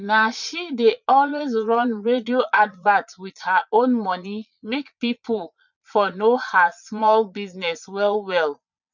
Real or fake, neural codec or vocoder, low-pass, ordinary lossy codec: fake; vocoder, 22.05 kHz, 80 mel bands, Vocos; 7.2 kHz; none